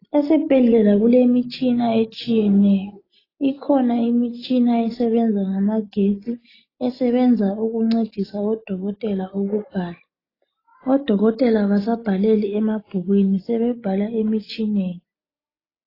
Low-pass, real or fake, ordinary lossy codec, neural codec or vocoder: 5.4 kHz; real; AAC, 24 kbps; none